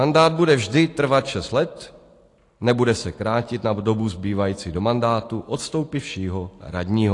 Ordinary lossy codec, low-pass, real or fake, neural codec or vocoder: AAC, 48 kbps; 10.8 kHz; real; none